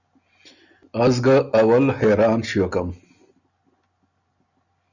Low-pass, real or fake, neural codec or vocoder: 7.2 kHz; real; none